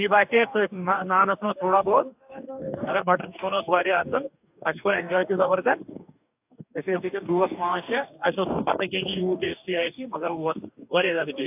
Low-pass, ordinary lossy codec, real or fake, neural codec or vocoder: 3.6 kHz; AAC, 32 kbps; fake; codec, 44.1 kHz, 2.6 kbps, DAC